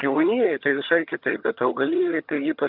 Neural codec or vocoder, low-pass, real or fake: vocoder, 22.05 kHz, 80 mel bands, HiFi-GAN; 5.4 kHz; fake